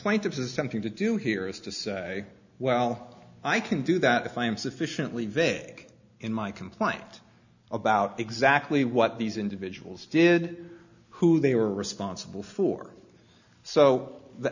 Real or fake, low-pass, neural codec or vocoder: real; 7.2 kHz; none